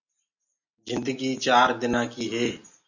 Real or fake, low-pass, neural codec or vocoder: real; 7.2 kHz; none